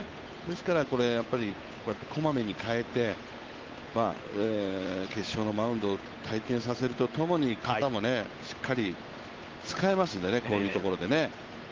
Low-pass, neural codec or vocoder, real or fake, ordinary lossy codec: 7.2 kHz; codec, 16 kHz, 8 kbps, FunCodec, trained on Chinese and English, 25 frames a second; fake; Opus, 32 kbps